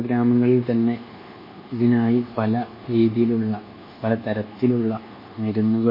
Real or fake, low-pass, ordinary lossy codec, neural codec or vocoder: fake; 5.4 kHz; MP3, 24 kbps; codec, 24 kHz, 1.2 kbps, DualCodec